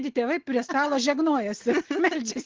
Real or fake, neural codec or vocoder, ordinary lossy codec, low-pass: real; none; Opus, 16 kbps; 7.2 kHz